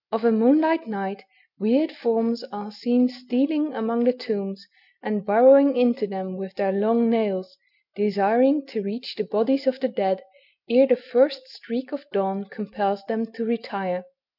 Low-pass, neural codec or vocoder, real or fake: 5.4 kHz; none; real